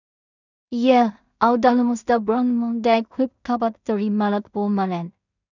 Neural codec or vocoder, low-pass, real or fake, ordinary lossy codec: codec, 16 kHz in and 24 kHz out, 0.4 kbps, LongCat-Audio-Codec, two codebook decoder; 7.2 kHz; fake; none